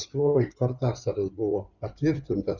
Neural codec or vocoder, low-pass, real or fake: codec, 16 kHz in and 24 kHz out, 2.2 kbps, FireRedTTS-2 codec; 7.2 kHz; fake